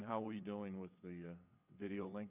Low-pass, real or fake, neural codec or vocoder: 3.6 kHz; fake; vocoder, 22.05 kHz, 80 mel bands, WaveNeXt